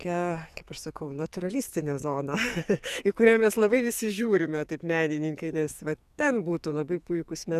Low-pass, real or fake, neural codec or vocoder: 14.4 kHz; fake; codec, 44.1 kHz, 2.6 kbps, SNAC